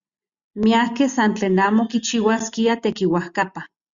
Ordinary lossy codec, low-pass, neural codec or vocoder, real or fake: Opus, 64 kbps; 7.2 kHz; none; real